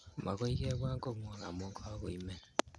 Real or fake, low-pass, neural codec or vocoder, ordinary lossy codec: real; none; none; none